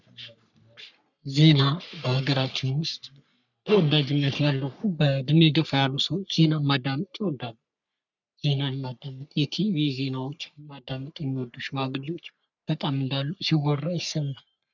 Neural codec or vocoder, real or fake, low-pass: codec, 44.1 kHz, 3.4 kbps, Pupu-Codec; fake; 7.2 kHz